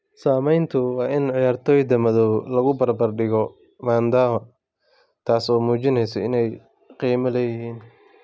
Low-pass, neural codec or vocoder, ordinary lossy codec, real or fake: none; none; none; real